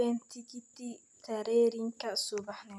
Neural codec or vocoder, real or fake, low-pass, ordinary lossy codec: none; real; none; none